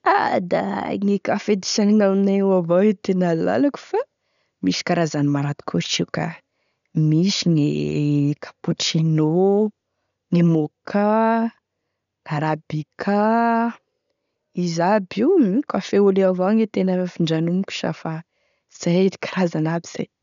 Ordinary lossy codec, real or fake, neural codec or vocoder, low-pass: none; real; none; 7.2 kHz